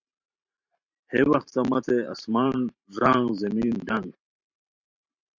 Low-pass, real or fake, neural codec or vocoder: 7.2 kHz; fake; vocoder, 44.1 kHz, 128 mel bands every 512 samples, BigVGAN v2